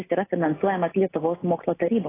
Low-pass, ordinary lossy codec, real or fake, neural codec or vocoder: 3.6 kHz; AAC, 16 kbps; real; none